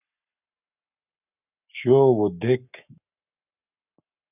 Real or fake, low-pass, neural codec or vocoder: fake; 3.6 kHz; codec, 44.1 kHz, 7.8 kbps, Pupu-Codec